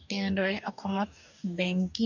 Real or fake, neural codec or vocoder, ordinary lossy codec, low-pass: fake; codec, 44.1 kHz, 2.6 kbps, DAC; none; 7.2 kHz